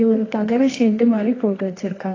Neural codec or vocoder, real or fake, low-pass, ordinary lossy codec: codec, 24 kHz, 0.9 kbps, WavTokenizer, medium music audio release; fake; 7.2 kHz; AAC, 32 kbps